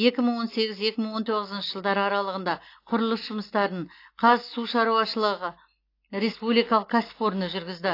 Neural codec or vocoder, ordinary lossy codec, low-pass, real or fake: none; AAC, 32 kbps; 5.4 kHz; real